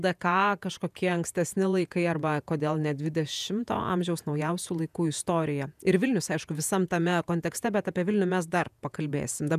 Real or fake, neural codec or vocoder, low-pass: fake; vocoder, 48 kHz, 128 mel bands, Vocos; 14.4 kHz